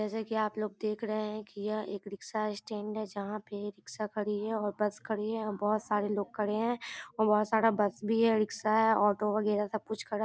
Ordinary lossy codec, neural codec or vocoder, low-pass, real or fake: none; none; none; real